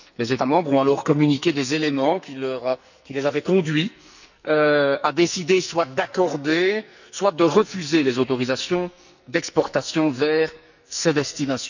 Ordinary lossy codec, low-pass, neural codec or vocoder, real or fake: none; 7.2 kHz; codec, 44.1 kHz, 2.6 kbps, SNAC; fake